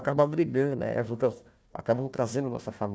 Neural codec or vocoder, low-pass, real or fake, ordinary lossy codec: codec, 16 kHz, 1 kbps, FunCodec, trained on Chinese and English, 50 frames a second; none; fake; none